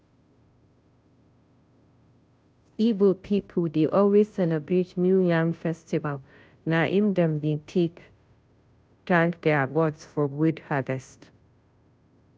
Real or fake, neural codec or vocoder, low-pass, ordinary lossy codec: fake; codec, 16 kHz, 0.5 kbps, FunCodec, trained on Chinese and English, 25 frames a second; none; none